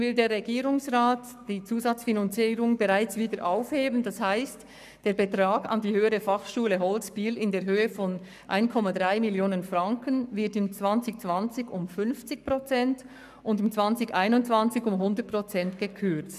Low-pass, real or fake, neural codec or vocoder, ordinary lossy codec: 14.4 kHz; fake; codec, 44.1 kHz, 7.8 kbps, Pupu-Codec; none